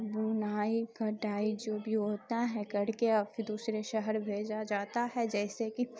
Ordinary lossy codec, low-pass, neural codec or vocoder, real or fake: none; none; none; real